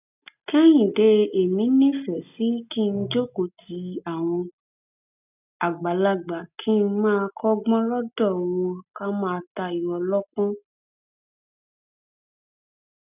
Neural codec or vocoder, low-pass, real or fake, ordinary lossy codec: none; 3.6 kHz; real; none